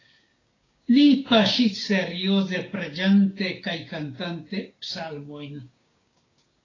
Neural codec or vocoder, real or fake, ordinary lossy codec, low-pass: codec, 16 kHz, 6 kbps, DAC; fake; AAC, 32 kbps; 7.2 kHz